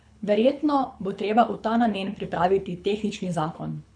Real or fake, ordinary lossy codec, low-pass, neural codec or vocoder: fake; none; 9.9 kHz; codec, 24 kHz, 6 kbps, HILCodec